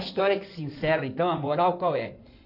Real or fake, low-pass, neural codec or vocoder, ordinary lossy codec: fake; 5.4 kHz; codec, 16 kHz in and 24 kHz out, 2.2 kbps, FireRedTTS-2 codec; none